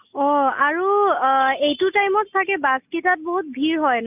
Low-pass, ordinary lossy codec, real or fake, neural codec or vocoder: 3.6 kHz; none; real; none